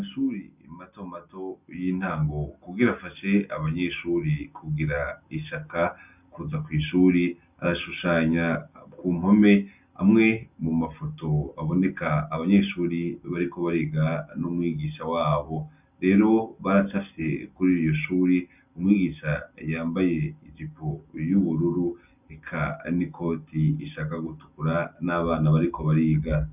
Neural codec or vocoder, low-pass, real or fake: none; 3.6 kHz; real